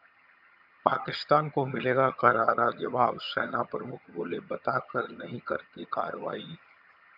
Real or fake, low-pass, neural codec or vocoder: fake; 5.4 kHz; vocoder, 22.05 kHz, 80 mel bands, HiFi-GAN